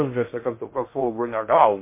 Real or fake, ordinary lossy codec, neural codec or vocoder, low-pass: fake; MP3, 24 kbps; codec, 16 kHz in and 24 kHz out, 0.6 kbps, FocalCodec, streaming, 2048 codes; 3.6 kHz